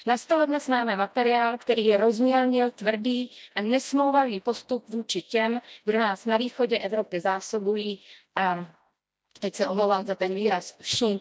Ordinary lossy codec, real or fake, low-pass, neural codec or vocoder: none; fake; none; codec, 16 kHz, 1 kbps, FreqCodec, smaller model